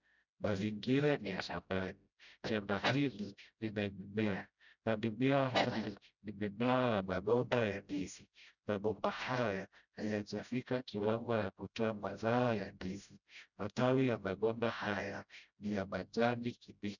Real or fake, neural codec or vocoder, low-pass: fake; codec, 16 kHz, 0.5 kbps, FreqCodec, smaller model; 7.2 kHz